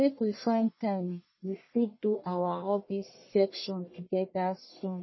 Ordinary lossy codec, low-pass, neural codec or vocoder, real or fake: MP3, 24 kbps; 7.2 kHz; codec, 44.1 kHz, 1.7 kbps, Pupu-Codec; fake